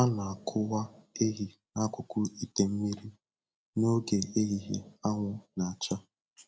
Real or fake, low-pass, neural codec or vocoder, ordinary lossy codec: real; none; none; none